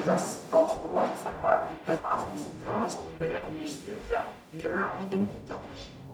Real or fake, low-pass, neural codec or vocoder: fake; 19.8 kHz; codec, 44.1 kHz, 0.9 kbps, DAC